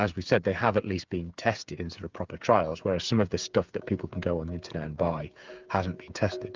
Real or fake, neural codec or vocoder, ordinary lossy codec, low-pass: fake; codec, 16 kHz, 8 kbps, FreqCodec, smaller model; Opus, 24 kbps; 7.2 kHz